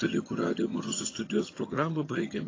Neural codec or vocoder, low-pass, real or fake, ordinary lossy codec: vocoder, 22.05 kHz, 80 mel bands, HiFi-GAN; 7.2 kHz; fake; AAC, 32 kbps